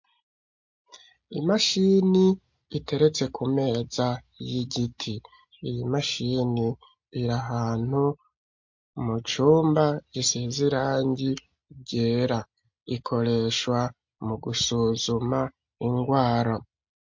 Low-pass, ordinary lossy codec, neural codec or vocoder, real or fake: 7.2 kHz; MP3, 48 kbps; none; real